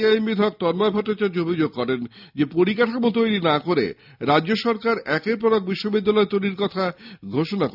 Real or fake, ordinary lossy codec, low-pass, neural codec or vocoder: real; none; 5.4 kHz; none